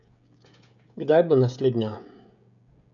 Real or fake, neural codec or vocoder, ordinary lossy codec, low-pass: fake; codec, 16 kHz, 16 kbps, FreqCodec, smaller model; none; 7.2 kHz